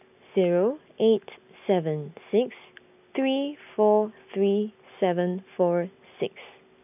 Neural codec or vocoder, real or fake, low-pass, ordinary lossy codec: none; real; 3.6 kHz; none